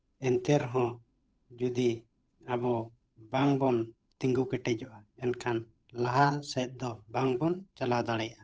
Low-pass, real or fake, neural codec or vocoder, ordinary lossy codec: none; fake; codec, 16 kHz, 8 kbps, FunCodec, trained on Chinese and English, 25 frames a second; none